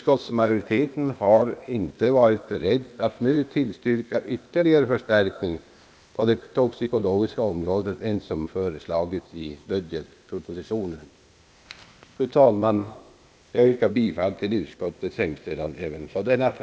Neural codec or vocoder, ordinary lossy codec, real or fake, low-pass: codec, 16 kHz, 0.8 kbps, ZipCodec; none; fake; none